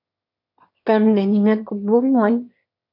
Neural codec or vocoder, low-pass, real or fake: autoencoder, 22.05 kHz, a latent of 192 numbers a frame, VITS, trained on one speaker; 5.4 kHz; fake